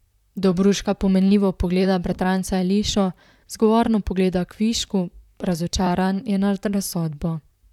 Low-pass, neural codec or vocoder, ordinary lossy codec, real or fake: 19.8 kHz; vocoder, 44.1 kHz, 128 mel bands, Pupu-Vocoder; none; fake